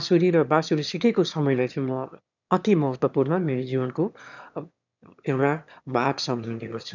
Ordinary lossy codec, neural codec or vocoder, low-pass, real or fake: none; autoencoder, 22.05 kHz, a latent of 192 numbers a frame, VITS, trained on one speaker; 7.2 kHz; fake